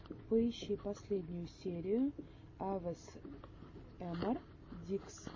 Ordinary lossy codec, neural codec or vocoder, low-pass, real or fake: MP3, 32 kbps; none; 7.2 kHz; real